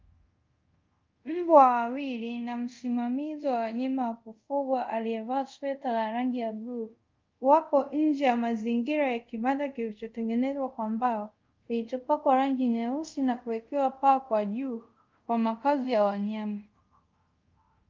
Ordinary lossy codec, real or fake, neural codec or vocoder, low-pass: Opus, 24 kbps; fake; codec, 24 kHz, 0.5 kbps, DualCodec; 7.2 kHz